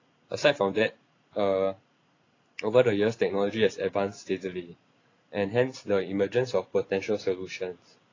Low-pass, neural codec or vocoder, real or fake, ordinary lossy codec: 7.2 kHz; none; real; AAC, 32 kbps